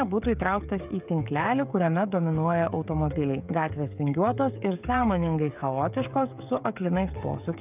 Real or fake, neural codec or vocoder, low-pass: fake; codec, 16 kHz, 16 kbps, FreqCodec, smaller model; 3.6 kHz